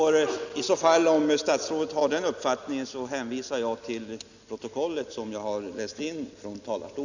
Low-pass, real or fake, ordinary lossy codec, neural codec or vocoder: 7.2 kHz; real; none; none